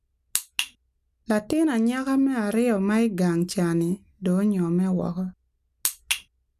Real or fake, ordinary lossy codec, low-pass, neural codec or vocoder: real; none; 14.4 kHz; none